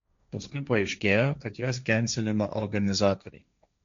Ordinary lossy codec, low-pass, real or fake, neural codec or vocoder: MP3, 64 kbps; 7.2 kHz; fake; codec, 16 kHz, 1.1 kbps, Voila-Tokenizer